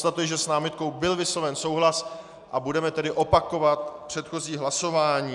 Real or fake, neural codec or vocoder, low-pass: real; none; 10.8 kHz